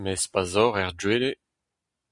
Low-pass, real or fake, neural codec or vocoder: 10.8 kHz; real; none